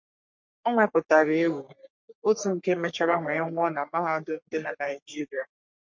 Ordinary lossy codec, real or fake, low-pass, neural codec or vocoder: MP3, 48 kbps; fake; 7.2 kHz; codec, 44.1 kHz, 3.4 kbps, Pupu-Codec